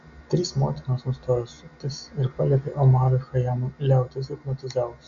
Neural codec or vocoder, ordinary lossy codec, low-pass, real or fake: none; MP3, 64 kbps; 7.2 kHz; real